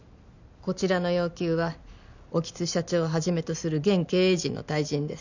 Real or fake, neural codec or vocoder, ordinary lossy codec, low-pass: real; none; none; 7.2 kHz